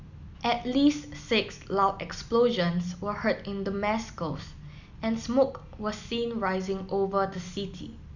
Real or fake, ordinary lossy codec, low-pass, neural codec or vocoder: real; none; 7.2 kHz; none